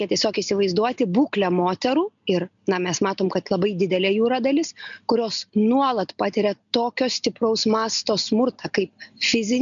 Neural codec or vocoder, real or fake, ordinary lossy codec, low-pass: none; real; MP3, 96 kbps; 7.2 kHz